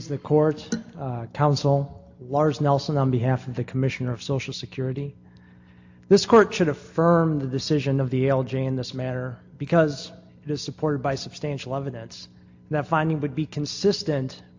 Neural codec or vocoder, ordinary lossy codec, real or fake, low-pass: none; AAC, 48 kbps; real; 7.2 kHz